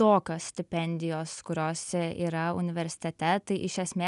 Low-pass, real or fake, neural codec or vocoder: 10.8 kHz; real; none